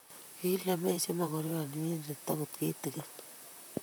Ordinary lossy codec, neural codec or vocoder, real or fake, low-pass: none; vocoder, 44.1 kHz, 128 mel bands, Pupu-Vocoder; fake; none